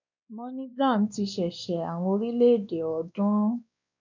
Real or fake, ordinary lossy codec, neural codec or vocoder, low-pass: fake; none; codec, 16 kHz, 2 kbps, X-Codec, WavLM features, trained on Multilingual LibriSpeech; 7.2 kHz